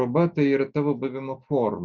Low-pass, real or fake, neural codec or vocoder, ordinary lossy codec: 7.2 kHz; real; none; Opus, 64 kbps